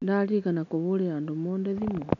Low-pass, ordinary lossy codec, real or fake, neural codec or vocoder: 7.2 kHz; none; real; none